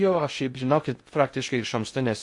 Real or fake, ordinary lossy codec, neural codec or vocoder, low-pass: fake; MP3, 48 kbps; codec, 16 kHz in and 24 kHz out, 0.6 kbps, FocalCodec, streaming, 2048 codes; 10.8 kHz